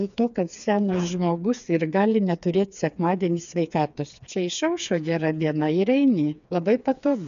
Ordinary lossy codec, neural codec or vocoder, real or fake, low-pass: MP3, 96 kbps; codec, 16 kHz, 4 kbps, FreqCodec, smaller model; fake; 7.2 kHz